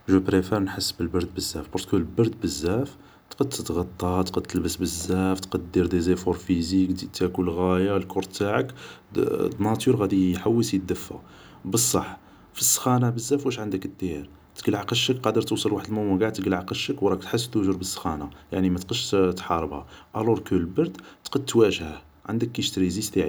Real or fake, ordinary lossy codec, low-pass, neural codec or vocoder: real; none; none; none